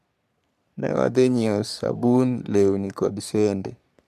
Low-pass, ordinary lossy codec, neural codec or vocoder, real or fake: 14.4 kHz; none; codec, 44.1 kHz, 3.4 kbps, Pupu-Codec; fake